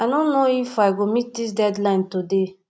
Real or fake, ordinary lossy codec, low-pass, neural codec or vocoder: real; none; none; none